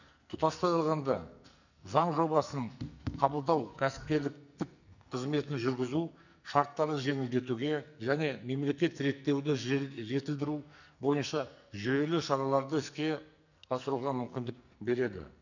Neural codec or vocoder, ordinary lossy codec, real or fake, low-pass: codec, 44.1 kHz, 2.6 kbps, SNAC; none; fake; 7.2 kHz